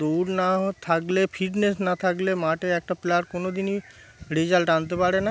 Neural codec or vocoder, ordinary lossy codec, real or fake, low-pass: none; none; real; none